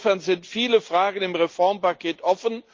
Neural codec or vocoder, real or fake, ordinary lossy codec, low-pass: none; real; Opus, 32 kbps; 7.2 kHz